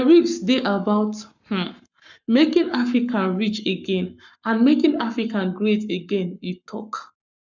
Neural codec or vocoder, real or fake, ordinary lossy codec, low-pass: codec, 44.1 kHz, 7.8 kbps, Pupu-Codec; fake; none; 7.2 kHz